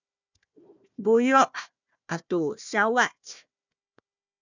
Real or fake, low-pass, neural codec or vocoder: fake; 7.2 kHz; codec, 16 kHz, 1 kbps, FunCodec, trained on Chinese and English, 50 frames a second